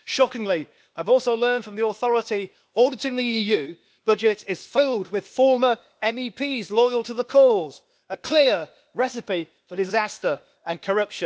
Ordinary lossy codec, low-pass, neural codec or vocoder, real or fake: none; none; codec, 16 kHz, 0.8 kbps, ZipCodec; fake